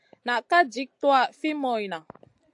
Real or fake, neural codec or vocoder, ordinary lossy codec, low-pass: real; none; AAC, 64 kbps; 10.8 kHz